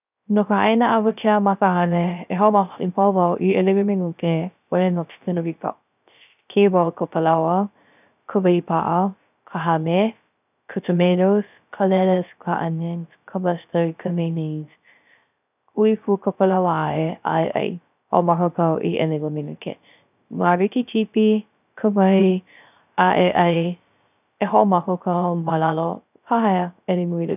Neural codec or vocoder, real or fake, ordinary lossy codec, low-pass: codec, 16 kHz, 0.3 kbps, FocalCodec; fake; none; 3.6 kHz